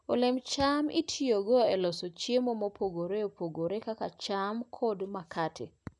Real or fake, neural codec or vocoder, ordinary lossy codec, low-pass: real; none; none; 10.8 kHz